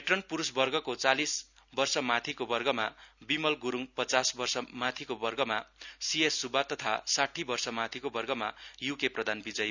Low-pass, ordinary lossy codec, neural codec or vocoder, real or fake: 7.2 kHz; none; none; real